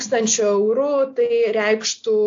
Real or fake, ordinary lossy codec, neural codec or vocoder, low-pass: real; AAC, 64 kbps; none; 7.2 kHz